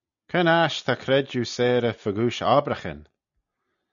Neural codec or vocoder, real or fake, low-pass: none; real; 7.2 kHz